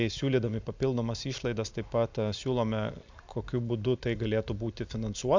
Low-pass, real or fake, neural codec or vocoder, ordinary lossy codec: 7.2 kHz; real; none; MP3, 64 kbps